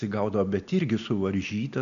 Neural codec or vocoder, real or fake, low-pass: none; real; 7.2 kHz